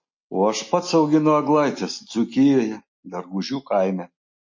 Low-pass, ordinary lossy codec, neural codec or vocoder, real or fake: 7.2 kHz; MP3, 32 kbps; none; real